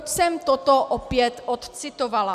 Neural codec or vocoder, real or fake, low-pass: none; real; 14.4 kHz